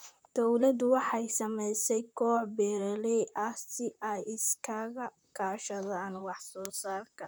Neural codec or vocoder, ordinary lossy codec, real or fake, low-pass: vocoder, 44.1 kHz, 128 mel bands, Pupu-Vocoder; none; fake; none